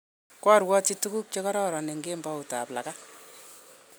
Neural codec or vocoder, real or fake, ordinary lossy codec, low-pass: none; real; none; none